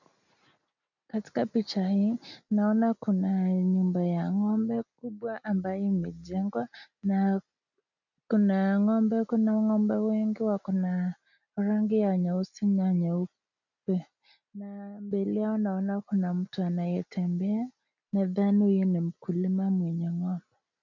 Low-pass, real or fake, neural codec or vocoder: 7.2 kHz; real; none